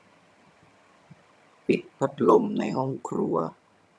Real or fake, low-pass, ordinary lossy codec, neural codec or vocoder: fake; none; none; vocoder, 22.05 kHz, 80 mel bands, HiFi-GAN